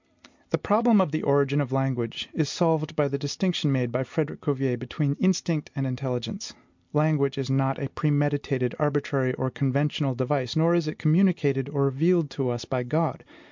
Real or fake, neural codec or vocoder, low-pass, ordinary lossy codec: real; none; 7.2 kHz; MP3, 64 kbps